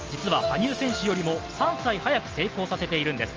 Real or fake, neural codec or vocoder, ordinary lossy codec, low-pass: real; none; Opus, 24 kbps; 7.2 kHz